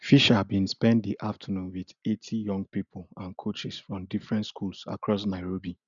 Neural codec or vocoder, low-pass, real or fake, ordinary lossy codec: none; 7.2 kHz; real; none